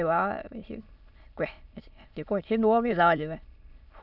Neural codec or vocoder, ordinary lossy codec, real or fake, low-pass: autoencoder, 22.05 kHz, a latent of 192 numbers a frame, VITS, trained on many speakers; none; fake; 5.4 kHz